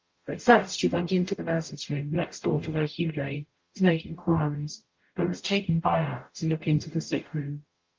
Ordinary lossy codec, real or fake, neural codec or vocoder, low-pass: Opus, 32 kbps; fake; codec, 44.1 kHz, 0.9 kbps, DAC; 7.2 kHz